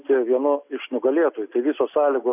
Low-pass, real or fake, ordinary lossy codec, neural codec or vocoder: 3.6 kHz; real; AAC, 32 kbps; none